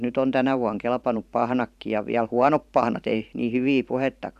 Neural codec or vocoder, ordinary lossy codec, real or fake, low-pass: vocoder, 44.1 kHz, 128 mel bands every 512 samples, BigVGAN v2; none; fake; 14.4 kHz